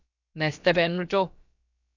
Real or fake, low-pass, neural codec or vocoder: fake; 7.2 kHz; codec, 16 kHz, about 1 kbps, DyCAST, with the encoder's durations